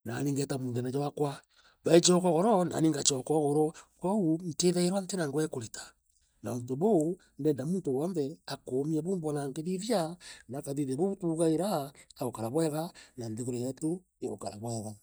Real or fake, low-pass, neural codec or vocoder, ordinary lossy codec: real; none; none; none